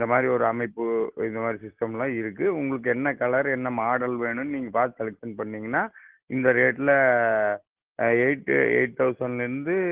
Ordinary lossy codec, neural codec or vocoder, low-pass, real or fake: Opus, 16 kbps; none; 3.6 kHz; real